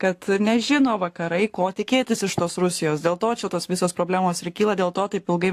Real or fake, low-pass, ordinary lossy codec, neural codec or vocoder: fake; 14.4 kHz; AAC, 64 kbps; codec, 44.1 kHz, 7.8 kbps, Pupu-Codec